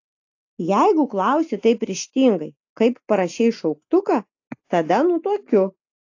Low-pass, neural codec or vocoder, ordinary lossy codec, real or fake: 7.2 kHz; none; AAC, 48 kbps; real